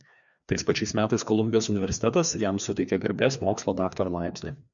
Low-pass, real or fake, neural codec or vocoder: 7.2 kHz; fake; codec, 16 kHz, 2 kbps, FreqCodec, larger model